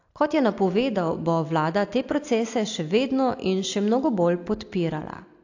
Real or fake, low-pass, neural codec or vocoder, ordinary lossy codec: real; 7.2 kHz; none; AAC, 48 kbps